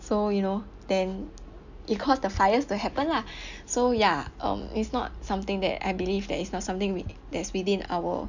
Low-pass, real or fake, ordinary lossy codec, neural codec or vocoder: 7.2 kHz; real; none; none